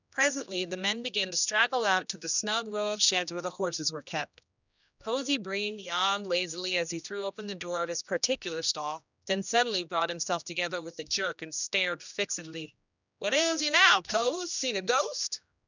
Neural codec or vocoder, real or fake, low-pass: codec, 16 kHz, 1 kbps, X-Codec, HuBERT features, trained on general audio; fake; 7.2 kHz